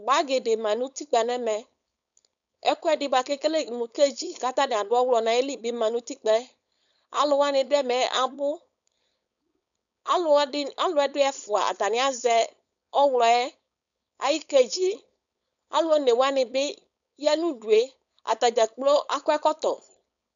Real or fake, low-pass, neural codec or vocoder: fake; 7.2 kHz; codec, 16 kHz, 4.8 kbps, FACodec